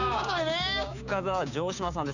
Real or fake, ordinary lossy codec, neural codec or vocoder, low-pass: fake; none; codec, 16 kHz, 6 kbps, DAC; 7.2 kHz